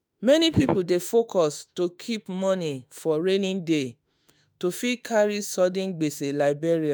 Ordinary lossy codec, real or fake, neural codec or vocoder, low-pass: none; fake; autoencoder, 48 kHz, 32 numbers a frame, DAC-VAE, trained on Japanese speech; none